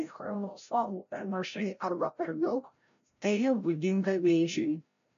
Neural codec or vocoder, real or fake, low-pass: codec, 16 kHz, 0.5 kbps, FreqCodec, larger model; fake; 7.2 kHz